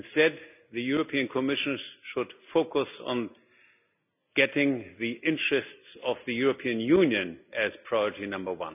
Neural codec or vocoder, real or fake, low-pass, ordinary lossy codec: none; real; 3.6 kHz; none